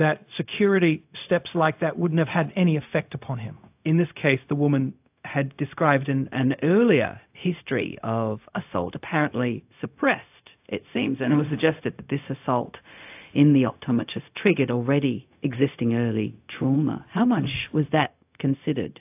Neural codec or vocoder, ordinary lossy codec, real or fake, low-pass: codec, 16 kHz, 0.4 kbps, LongCat-Audio-Codec; AAC, 32 kbps; fake; 3.6 kHz